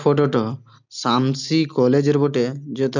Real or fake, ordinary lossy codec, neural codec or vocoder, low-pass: real; none; none; 7.2 kHz